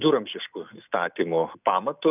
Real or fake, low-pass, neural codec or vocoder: real; 3.6 kHz; none